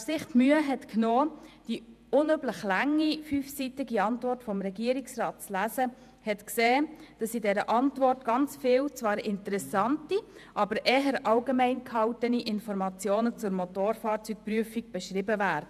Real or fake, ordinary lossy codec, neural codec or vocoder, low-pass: fake; none; vocoder, 48 kHz, 128 mel bands, Vocos; 14.4 kHz